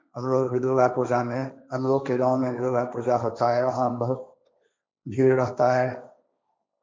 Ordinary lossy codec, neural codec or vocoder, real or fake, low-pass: none; codec, 16 kHz, 1.1 kbps, Voila-Tokenizer; fake; none